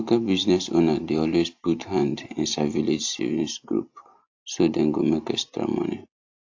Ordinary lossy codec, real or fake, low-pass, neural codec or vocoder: AAC, 48 kbps; real; 7.2 kHz; none